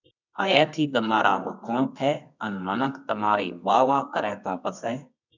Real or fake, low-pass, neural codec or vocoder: fake; 7.2 kHz; codec, 24 kHz, 0.9 kbps, WavTokenizer, medium music audio release